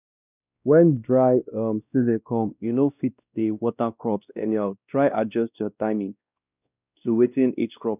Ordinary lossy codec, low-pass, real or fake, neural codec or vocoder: none; 3.6 kHz; fake; codec, 16 kHz, 1 kbps, X-Codec, WavLM features, trained on Multilingual LibriSpeech